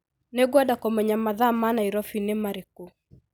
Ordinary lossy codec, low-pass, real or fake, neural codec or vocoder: none; none; real; none